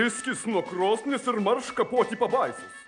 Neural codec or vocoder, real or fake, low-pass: none; real; 9.9 kHz